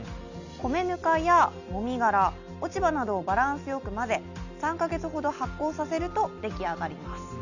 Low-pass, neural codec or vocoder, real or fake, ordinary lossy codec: 7.2 kHz; none; real; none